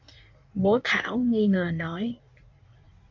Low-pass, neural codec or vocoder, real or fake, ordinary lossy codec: 7.2 kHz; codec, 16 kHz in and 24 kHz out, 1.1 kbps, FireRedTTS-2 codec; fake; MP3, 64 kbps